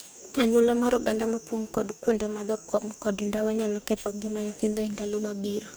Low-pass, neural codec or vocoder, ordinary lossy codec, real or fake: none; codec, 44.1 kHz, 2.6 kbps, DAC; none; fake